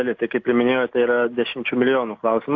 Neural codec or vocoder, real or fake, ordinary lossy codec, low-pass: none; real; AAC, 48 kbps; 7.2 kHz